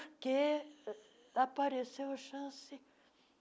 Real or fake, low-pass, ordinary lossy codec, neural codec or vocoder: real; none; none; none